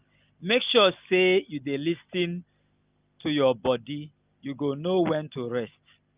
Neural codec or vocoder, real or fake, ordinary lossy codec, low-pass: none; real; Opus, 24 kbps; 3.6 kHz